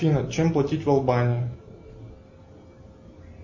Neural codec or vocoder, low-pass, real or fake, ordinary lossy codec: none; 7.2 kHz; real; MP3, 32 kbps